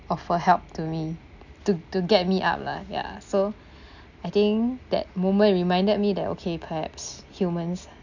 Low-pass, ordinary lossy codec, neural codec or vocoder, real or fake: 7.2 kHz; none; none; real